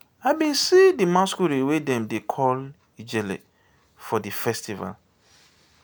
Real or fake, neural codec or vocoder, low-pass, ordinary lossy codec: real; none; none; none